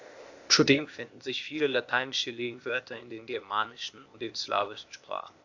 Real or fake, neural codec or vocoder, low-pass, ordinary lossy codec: fake; codec, 16 kHz, 0.8 kbps, ZipCodec; 7.2 kHz; AAC, 48 kbps